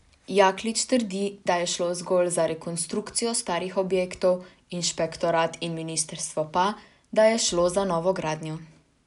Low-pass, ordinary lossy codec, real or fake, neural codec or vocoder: 10.8 kHz; none; real; none